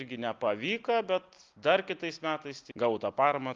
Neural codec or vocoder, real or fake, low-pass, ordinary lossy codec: none; real; 7.2 kHz; Opus, 32 kbps